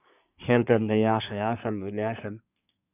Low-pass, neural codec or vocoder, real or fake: 3.6 kHz; codec, 24 kHz, 1 kbps, SNAC; fake